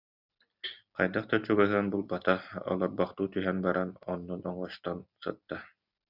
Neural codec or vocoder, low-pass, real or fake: none; 5.4 kHz; real